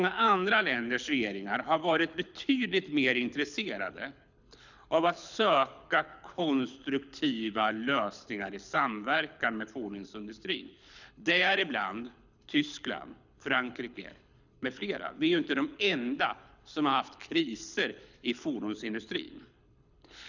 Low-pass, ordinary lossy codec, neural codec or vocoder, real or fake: 7.2 kHz; none; codec, 24 kHz, 6 kbps, HILCodec; fake